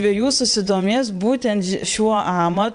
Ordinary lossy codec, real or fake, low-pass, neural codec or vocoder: AAC, 96 kbps; fake; 9.9 kHz; vocoder, 22.05 kHz, 80 mel bands, Vocos